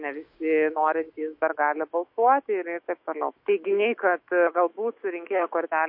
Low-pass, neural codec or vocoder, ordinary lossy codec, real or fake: 5.4 kHz; autoencoder, 48 kHz, 128 numbers a frame, DAC-VAE, trained on Japanese speech; MP3, 32 kbps; fake